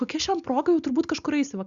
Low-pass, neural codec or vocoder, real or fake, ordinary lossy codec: 7.2 kHz; none; real; Opus, 64 kbps